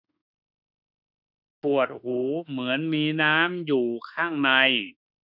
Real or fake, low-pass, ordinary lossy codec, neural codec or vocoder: fake; 5.4 kHz; none; autoencoder, 48 kHz, 32 numbers a frame, DAC-VAE, trained on Japanese speech